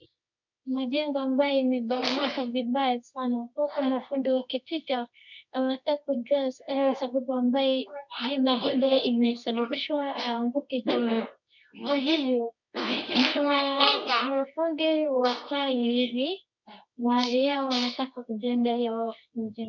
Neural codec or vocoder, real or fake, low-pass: codec, 24 kHz, 0.9 kbps, WavTokenizer, medium music audio release; fake; 7.2 kHz